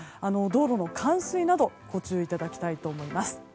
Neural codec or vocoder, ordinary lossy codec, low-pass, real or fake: none; none; none; real